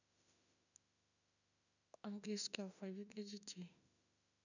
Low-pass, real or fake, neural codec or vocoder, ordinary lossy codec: 7.2 kHz; fake; autoencoder, 48 kHz, 32 numbers a frame, DAC-VAE, trained on Japanese speech; none